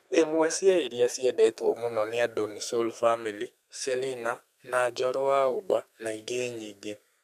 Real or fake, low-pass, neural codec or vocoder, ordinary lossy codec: fake; 14.4 kHz; codec, 32 kHz, 1.9 kbps, SNAC; none